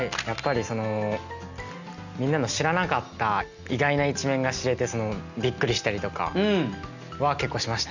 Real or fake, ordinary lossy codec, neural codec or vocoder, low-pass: real; none; none; 7.2 kHz